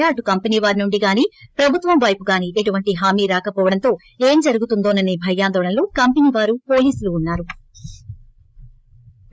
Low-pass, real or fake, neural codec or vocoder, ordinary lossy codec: none; fake; codec, 16 kHz, 8 kbps, FreqCodec, larger model; none